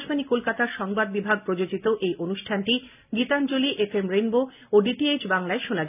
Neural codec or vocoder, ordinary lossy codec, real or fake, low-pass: none; none; real; 3.6 kHz